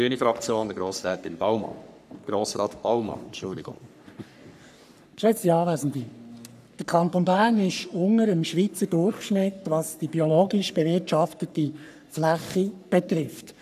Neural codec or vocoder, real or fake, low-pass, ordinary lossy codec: codec, 44.1 kHz, 3.4 kbps, Pupu-Codec; fake; 14.4 kHz; none